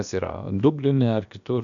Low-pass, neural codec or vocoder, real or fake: 7.2 kHz; codec, 16 kHz, about 1 kbps, DyCAST, with the encoder's durations; fake